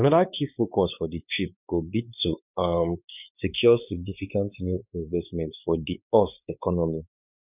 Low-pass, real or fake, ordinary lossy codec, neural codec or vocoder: 3.6 kHz; fake; none; codec, 16 kHz, 4 kbps, X-Codec, WavLM features, trained on Multilingual LibriSpeech